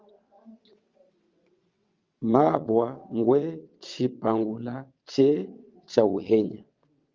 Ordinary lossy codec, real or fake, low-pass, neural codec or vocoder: Opus, 32 kbps; fake; 7.2 kHz; vocoder, 22.05 kHz, 80 mel bands, WaveNeXt